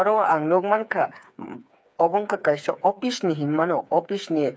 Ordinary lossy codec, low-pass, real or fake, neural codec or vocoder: none; none; fake; codec, 16 kHz, 4 kbps, FreqCodec, smaller model